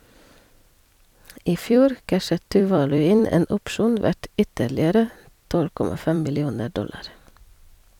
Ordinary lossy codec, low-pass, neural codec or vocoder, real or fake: none; 19.8 kHz; vocoder, 44.1 kHz, 128 mel bands every 256 samples, BigVGAN v2; fake